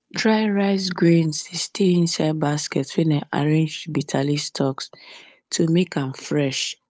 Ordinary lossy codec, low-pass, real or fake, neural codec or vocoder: none; none; fake; codec, 16 kHz, 8 kbps, FunCodec, trained on Chinese and English, 25 frames a second